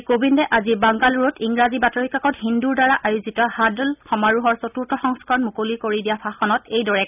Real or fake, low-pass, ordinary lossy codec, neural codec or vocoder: real; 3.6 kHz; none; none